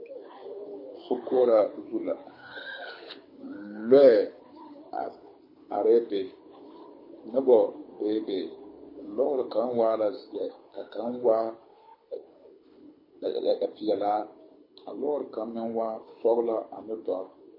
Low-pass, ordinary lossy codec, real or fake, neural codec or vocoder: 5.4 kHz; MP3, 24 kbps; fake; codec, 24 kHz, 6 kbps, HILCodec